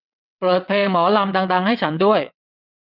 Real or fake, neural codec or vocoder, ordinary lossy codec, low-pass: fake; vocoder, 22.05 kHz, 80 mel bands, WaveNeXt; none; 5.4 kHz